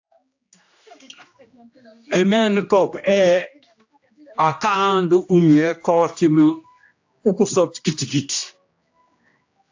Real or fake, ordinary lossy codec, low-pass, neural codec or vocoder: fake; AAC, 48 kbps; 7.2 kHz; codec, 16 kHz, 1 kbps, X-Codec, HuBERT features, trained on general audio